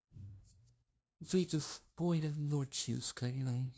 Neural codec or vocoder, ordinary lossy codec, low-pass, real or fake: codec, 16 kHz, 0.5 kbps, FunCodec, trained on LibriTTS, 25 frames a second; none; none; fake